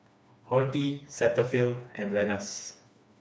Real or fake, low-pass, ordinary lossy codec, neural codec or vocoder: fake; none; none; codec, 16 kHz, 2 kbps, FreqCodec, smaller model